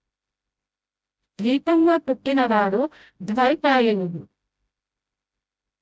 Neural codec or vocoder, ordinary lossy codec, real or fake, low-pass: codec, 16 kHz, 0.5 kbps, FreqCodec, smaller model; none; fake; none